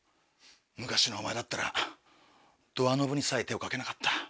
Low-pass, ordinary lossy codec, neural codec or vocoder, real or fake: none; none; none; real